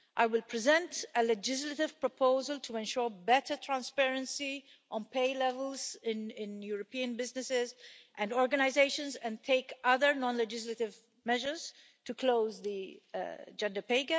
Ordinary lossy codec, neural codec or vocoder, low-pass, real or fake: none; none; none; real